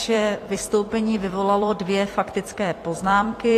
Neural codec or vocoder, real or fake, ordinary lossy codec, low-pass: vocoder, 44.1 kHz, 128 mel bands every 256 samples, BigVGAN v2; fake; AAC, 48 kbps; 14.4 kHz